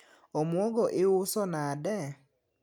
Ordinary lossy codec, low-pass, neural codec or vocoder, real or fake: none; 19.8 kHz; none; real